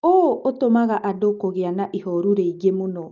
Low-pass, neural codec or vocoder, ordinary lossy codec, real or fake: 7.2 kHz; none; Opus, 32 kbps; real